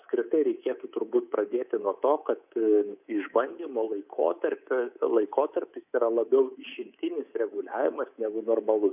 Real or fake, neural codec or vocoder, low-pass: fake; codec, 24 kHz, 3.1 kbps, DualCodec; 3.6 kHz